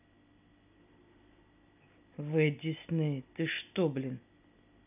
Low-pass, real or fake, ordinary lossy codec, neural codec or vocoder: 3.6 kHz; real; none; none